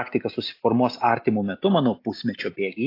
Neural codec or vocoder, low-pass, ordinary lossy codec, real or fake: none; 5.4 kHz; AAC, 32 kbps; real